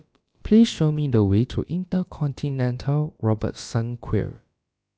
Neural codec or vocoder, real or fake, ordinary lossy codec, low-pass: codec, 16 kHz, about 1 kbps, DyCAST, with the encoder's durations; fake; none; none